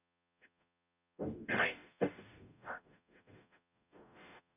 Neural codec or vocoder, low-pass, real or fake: codec, 44.1 kHz, 0.9 kbps, DAC; 3.6 kHz; fake